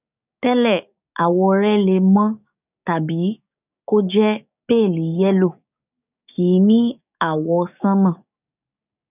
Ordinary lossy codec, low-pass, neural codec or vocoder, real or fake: none; 3.6 kHz; codec, 16 kHz, 6 kbps, DAC; fake